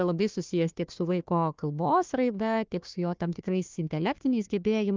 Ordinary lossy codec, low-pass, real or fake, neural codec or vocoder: Opus, 24 kbps; 7.2 kHz; fake; codec, 16 kHz, 1 kbps, FunCodec, trained on Chinese and English, 50 frames a second